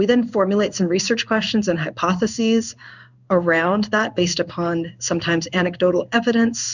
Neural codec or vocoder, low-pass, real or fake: codec, 16 kHz in and 24 kHz out, 1 kbps, XY-Tokenizer; 7.2 kHz; fake